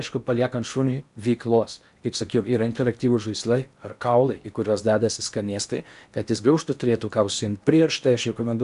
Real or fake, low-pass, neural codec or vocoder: fake; 10.8 kHz; codec, 16 kHz in and 24 kHz out, 0.8 kbps, FocalCodec, streaming, 65536 codes